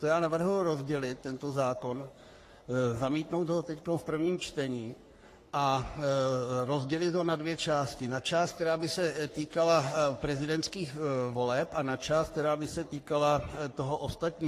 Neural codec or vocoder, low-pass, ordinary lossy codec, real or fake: codec, 44.1 kHz, 3.4 kbps, Pupu-Codec; 14.4 kHz; AAC, 48 kbps; fake